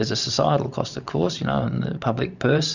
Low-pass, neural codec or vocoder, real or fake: 7.2 kHz; vocoder, 44.1 kHz, 128 mel bands every 512 samples, BigVGAN v2; fake